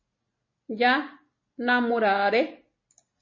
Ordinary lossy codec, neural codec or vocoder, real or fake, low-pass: MP3, 32 kbps; none; real; 7.2 kHz